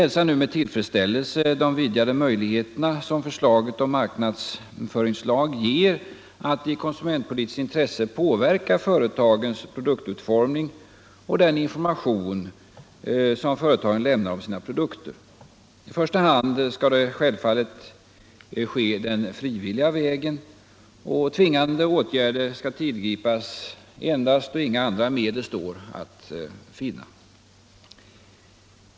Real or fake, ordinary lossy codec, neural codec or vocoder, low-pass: real; none; none; none